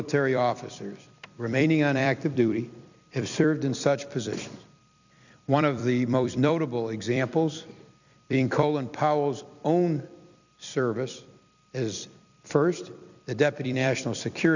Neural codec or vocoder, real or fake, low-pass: vocoder, 44.1 kHz, 128 mel bands every 256 samples, BigVGAN v2; fake; 7.2 kHz